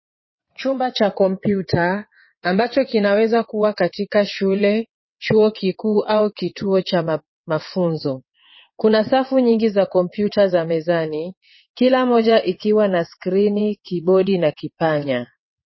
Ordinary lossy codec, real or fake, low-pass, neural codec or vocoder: MP3, 24 kbps; fake; 7.2 kHz; vocoder, 22.05 kHz, 80 mel bands, WaveNeXt